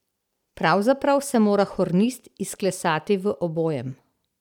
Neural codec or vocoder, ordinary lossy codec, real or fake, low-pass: none; none; real; 19.8 kHz